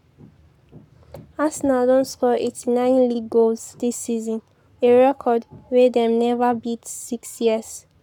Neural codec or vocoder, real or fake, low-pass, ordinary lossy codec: codec, 44.1 kHz, 7.8 kbps, Pupu-Codec; fake; 19.8 kHz; none